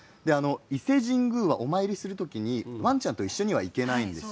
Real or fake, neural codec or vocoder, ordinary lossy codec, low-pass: real; none; none; none